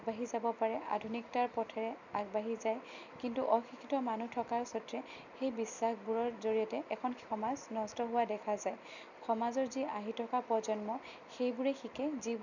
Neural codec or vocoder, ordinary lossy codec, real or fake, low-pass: none; none; real; 7.2 kHz